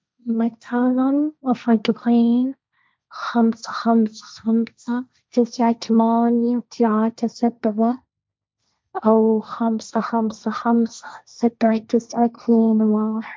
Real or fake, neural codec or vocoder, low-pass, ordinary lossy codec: fake; codec, 16 kHz, 1.1 kbps, Voila-Tokenizer; none; none